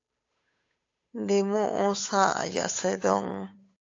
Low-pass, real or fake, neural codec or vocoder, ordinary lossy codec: 7.2 kHz; fake; codec, 16 kHz, 8 kbps, FunCodec, trained on Chinese and English, 25 frames a second; AAC, 48 kbps